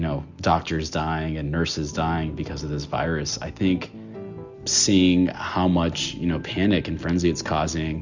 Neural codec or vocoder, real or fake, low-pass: none; real; 7.2 kHz